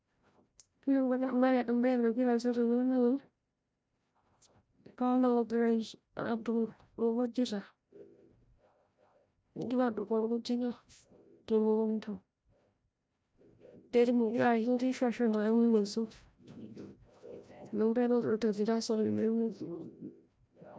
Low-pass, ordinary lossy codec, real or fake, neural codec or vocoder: none; none; fake; codec, 16 kHz, 0.5 kbps, FreqCodec, larger model